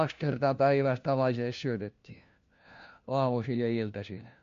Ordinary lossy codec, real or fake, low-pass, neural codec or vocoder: MP3, 48 kbps; fake; 7.2 kHz; codec, 16 kHz, 1 kbps, FunCodec, trained on LibriTTS, 50 frames a second